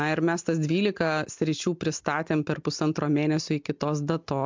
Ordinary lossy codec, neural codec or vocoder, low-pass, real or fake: MP3, 64 kbps; none; 7.2 kHz; real